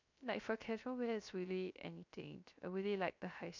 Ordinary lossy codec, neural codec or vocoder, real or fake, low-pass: none; codec, 16 kHz, 0.3 kbps, FocalCodec; fake; 7.2 kHz